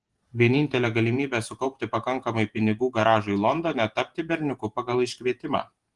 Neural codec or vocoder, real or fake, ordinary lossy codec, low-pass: vocoder, 48 kHz, 128 mel bands, Vocos; fake; Opus, 24 kbps; 10.8 kHz